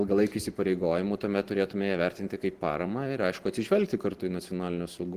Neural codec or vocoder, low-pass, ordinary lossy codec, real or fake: none; 14.4 kHz; Opus, 16 kbps; real